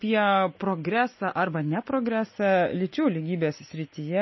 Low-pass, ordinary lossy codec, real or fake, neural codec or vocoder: 7.2 kHz; MP3, 24 kbps; real; none